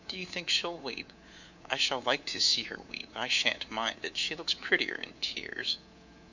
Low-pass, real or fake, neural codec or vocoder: 7.2 kHz; fake; codec, 24 kHz, 3.1 kbps, DualCodec